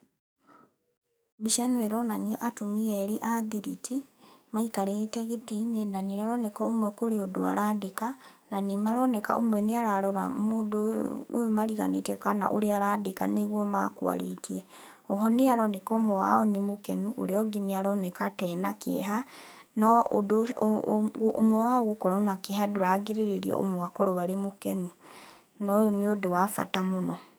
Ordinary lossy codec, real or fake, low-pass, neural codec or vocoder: none; fake; none; codec, 44.1 kHz, 2.6 kbps, SNAC